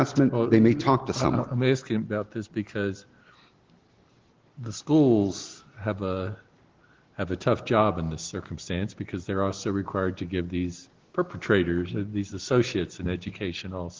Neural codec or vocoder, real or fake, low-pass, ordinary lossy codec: none; real; 7.2 kHz; Opus, 16 kbps